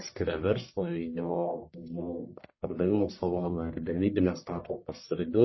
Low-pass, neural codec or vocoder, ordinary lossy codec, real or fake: 7.2 kHz; codec, 44.1 kHz, 1.7 kbps, Pupu-Codec; MP3, 24 kbps; fake